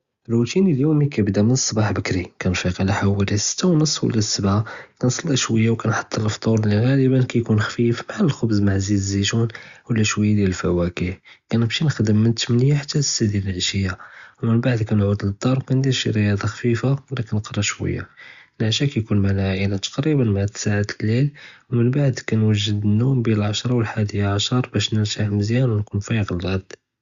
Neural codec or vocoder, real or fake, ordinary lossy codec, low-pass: none; real; Opus, 64 kbps; 7.2 kHz